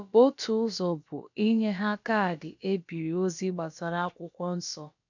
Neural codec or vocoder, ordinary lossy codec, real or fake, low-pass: codec, 16 kHz, about 1 kbps, DyCAST, with the encoder's durations; none; fake; 7.2 kHz